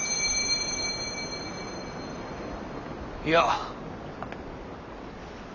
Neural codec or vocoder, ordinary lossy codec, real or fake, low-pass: none; none; real; 7.2 kHz